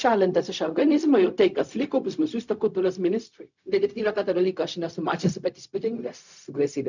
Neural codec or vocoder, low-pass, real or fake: codec, 16 kHz, 0.4 kbps, LongCat-Audio-Codec; 7.2 kHz; fake